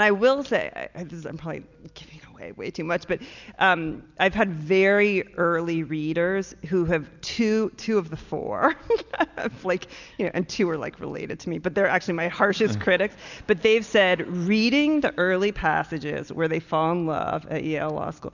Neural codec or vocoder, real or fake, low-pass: none; real; 7.2 kHz